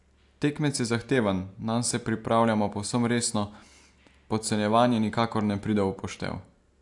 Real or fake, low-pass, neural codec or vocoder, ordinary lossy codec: real; 10.8 kHz; none; AAC, 64 kbps